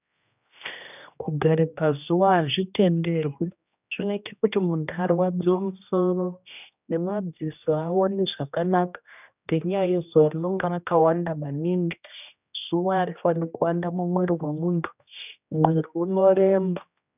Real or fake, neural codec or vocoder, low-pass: fake; codec, 16 kHz, 1 kbps, X-Codec, HuBERT features, trained on general audio; 3.6 kHz